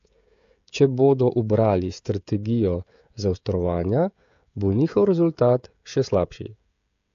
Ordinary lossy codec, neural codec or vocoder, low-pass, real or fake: AAC, 64 kbps; codec, 16 kHz, 16 kbps, FreqCodec, smaller model; 7.2 kHz; fake